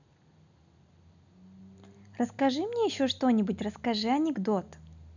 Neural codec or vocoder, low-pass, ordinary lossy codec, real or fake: none; 7.2 kHz; none; real